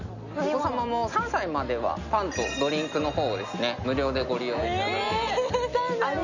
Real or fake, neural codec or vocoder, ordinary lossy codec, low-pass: real; none; none; 7.2 kHz